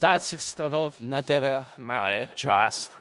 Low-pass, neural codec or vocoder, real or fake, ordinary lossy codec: 10.8 kHz; codec, 16 kHz in and 24 kHz out, 0.4 kbps, LongCat-Audio-Codec, four codebook decoder; fake; MP3, 64 kbps